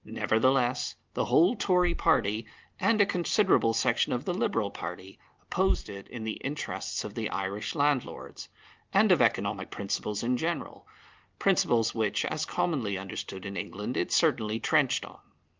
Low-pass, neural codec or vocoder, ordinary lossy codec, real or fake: 7.2 kHz; none; Opus, 32 kbps; real